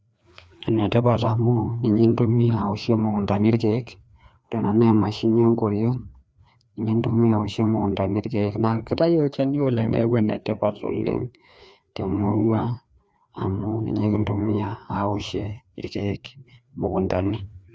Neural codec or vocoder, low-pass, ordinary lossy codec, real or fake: codec, 16 kHz, 2 kbps, FreqCodec, larger model; none; none; fake